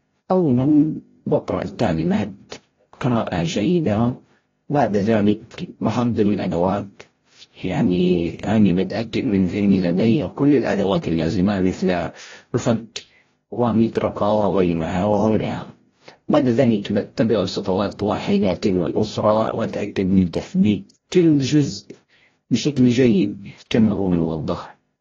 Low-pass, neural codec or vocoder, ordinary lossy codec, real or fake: 7.2 kHz; codec, 16 kHz, 0.5 kbps, FreqCodec, larger model; AAC, 32 kbps; fake